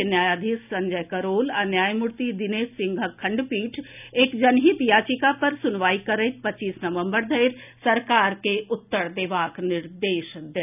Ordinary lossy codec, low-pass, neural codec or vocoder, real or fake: none; 3.6 kHz; none; real